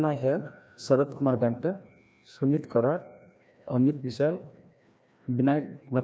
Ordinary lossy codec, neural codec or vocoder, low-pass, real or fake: none; codec, 16 kHz, 1 kbps, FreqCodec, larger model; none; fake